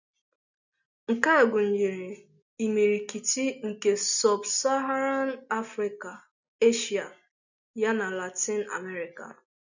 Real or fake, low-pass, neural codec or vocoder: real; 7.2 kHz; none